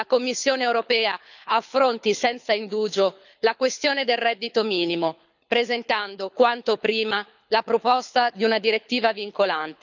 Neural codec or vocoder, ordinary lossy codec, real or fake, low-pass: codec, 24 kHz, 6 kbps, HILCodec; none; fake; 7.2 kHz